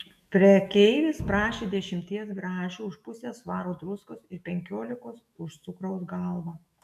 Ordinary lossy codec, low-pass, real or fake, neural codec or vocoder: MP3, 64 kbps; 14.4 kHz; fake; autoencoder, 48 kHz, 128 numbers a frame, DAC-VAE, trained on Japanese speech